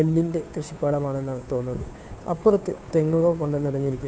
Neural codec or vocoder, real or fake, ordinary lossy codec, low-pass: codec, 16 kHz, 2 kbps, FunCodec, trained on Chinese and English, 25 frames a second; fake; none; none